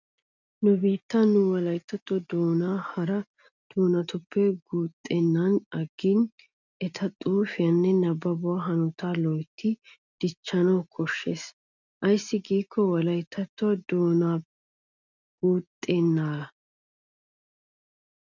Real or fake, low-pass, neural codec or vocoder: real; 7.2 kHz; none